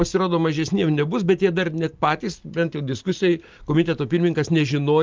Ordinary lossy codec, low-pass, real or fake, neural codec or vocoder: Opus, 32 kbps; 7.2 kHz; real; none